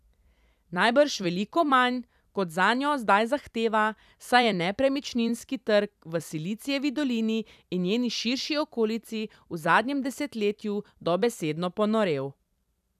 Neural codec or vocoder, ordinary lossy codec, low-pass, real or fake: vocoder, 44.1 kHz, 128 mel bands every 256 samples, BigVGAN v2; none; 14.4 kHz; fake